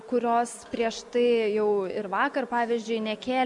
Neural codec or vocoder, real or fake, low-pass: none; real; 10.8 kHz